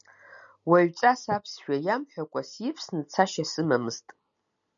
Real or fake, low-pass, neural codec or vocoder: real; 7.2 kHz; none